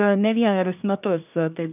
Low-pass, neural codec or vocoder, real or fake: 3.6 kHz; codec, 24 kHz, 1 kbps, SNAC; fake